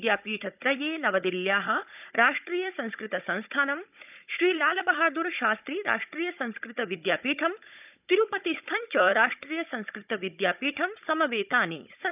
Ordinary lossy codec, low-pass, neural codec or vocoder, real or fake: none; 3.6 kHz; codec, 16 kHz, 16 kbps, FunCodec, trained on Chinese and English, 50 frames a second; fake